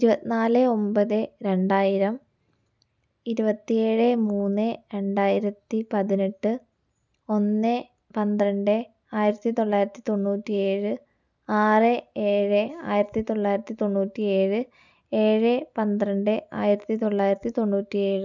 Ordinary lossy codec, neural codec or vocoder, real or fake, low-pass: none; none; real; 7.2 kHz